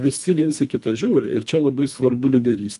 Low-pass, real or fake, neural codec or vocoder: 10.8 kHz; fake; codec, 24 kHz, 1.5 kbps, HILCodec